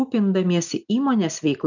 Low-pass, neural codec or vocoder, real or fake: 7.2 kHz; none; real